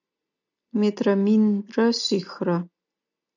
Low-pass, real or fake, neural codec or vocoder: 7.2 kHz; real; none